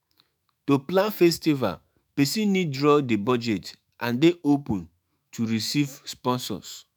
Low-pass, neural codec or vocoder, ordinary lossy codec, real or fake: none; autoencoder, 48 kHz, 128 numbers a frame, DAC-VAE, trained on Japanese speech; none; fake